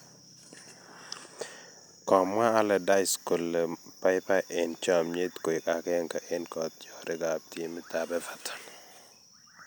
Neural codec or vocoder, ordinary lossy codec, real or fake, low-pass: none; none; real; none